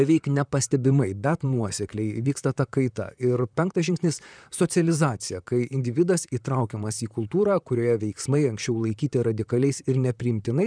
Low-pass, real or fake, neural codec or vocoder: 9.9 kHz; fake; vocoder, 44.1 kHz, 128 mel bands, Pupu-Vocoder